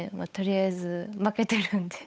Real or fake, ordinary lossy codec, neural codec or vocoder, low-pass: fake; none; codec, 16 kHz, 8 kbps, FunCodec, trained on Chinese and English, 25 frames a second; none